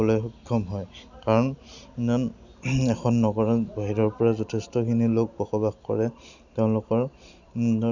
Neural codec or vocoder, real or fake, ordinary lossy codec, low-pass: none; real; none; 7.2 kHz